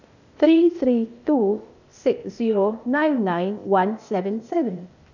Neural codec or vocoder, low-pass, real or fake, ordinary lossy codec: codec, 16 kHz, 0.8 kbps, ZipCodec; 7.2 kHz; fake; none